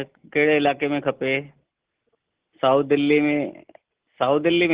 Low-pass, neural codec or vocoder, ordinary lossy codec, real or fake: 3.6 kHz; none; Opus, 16 kbps; real